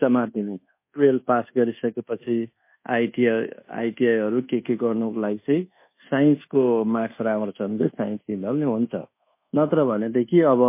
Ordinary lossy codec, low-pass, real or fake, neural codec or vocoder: MP3, 24 kbps; 3.6 kHz; fake; codec, 24 kHz, 1.2 kbps, DualCodec